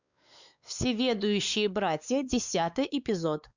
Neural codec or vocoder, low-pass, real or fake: codec, 16 kHz, 4 kbps, X-Codec, WavLM features, trained on Multilingual LibriSpeech; 7.2 kHz; fake